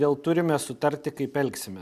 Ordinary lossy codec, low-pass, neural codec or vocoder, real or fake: MP3, 96 kbps; 14.4 kHz; none; real